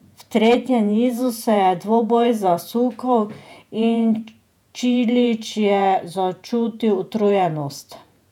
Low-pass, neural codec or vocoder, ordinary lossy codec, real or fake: 19.8 kHz; vocoder, 48 kHz, 128 mel bands, Vocos; none; fake